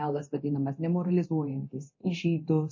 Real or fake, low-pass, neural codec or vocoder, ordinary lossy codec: fake; 7.2 kHz; codec, 24 kHz, 0.9 kbps, DualCodec; MP3, 32 kbps